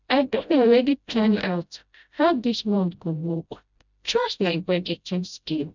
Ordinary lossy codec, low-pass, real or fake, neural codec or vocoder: none; 7.2 kHz; fake; codec, 16 kHz, 0.5 kbps, FreqCodec, smaller model